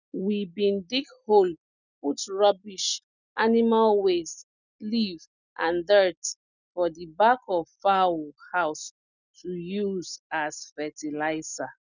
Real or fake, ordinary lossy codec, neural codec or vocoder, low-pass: real; none; none; 7.2 kHz